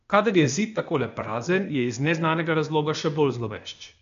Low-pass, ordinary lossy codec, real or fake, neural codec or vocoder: 7.2 kHz; MP3, 64 kbps; fake; codec, 16 kHz, 0.8 kbps, ZipCodec